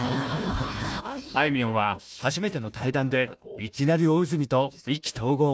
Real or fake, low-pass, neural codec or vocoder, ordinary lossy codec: fake; none; codec, 16 kHz, 1 kbps, FunCodec, trained on Chinese and English, 50 frames a second; none